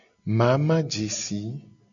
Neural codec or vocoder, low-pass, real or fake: none; 7.2 kHz; real